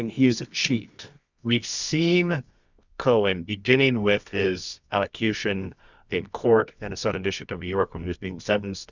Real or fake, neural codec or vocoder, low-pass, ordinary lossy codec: fake; codec, 24 kHz, 0.9 kbps, WavTokenizer, medium music audio release; 7.2 kHz; Opus, 64 kbps